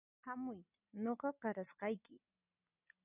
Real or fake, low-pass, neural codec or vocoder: real; 3.6 kHz; none